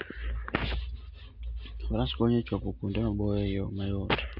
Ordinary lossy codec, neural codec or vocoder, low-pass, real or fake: none; none; 5.4 kHz; real